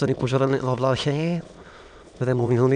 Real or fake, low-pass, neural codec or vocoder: fake; 9.9 kHz; autoencoder, 22.05 kHz, a latent of 192 numbers a frame, VITS, trained on many speakers